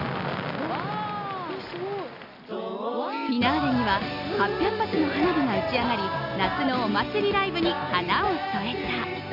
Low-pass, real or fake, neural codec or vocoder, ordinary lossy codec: 5.4 kHz; real; none; none